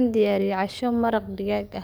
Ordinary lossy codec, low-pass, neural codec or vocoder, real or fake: none; none; codec, 44.1 kHz, 7.8 kbps, DAC; fake